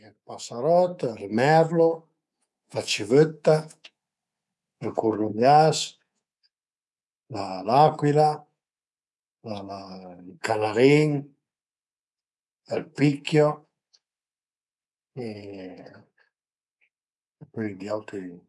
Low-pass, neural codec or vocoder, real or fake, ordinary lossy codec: none; codec, 24 kHz, 3.1 kbps, DualCodec; fake; none